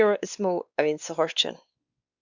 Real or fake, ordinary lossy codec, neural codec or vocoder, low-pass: fake; Opus, 64 kbps; codec, 16 kHz, 2 kbps, X-Codec, WavLM features, trained on Multilingual LibriSpeech; 7.2 kHz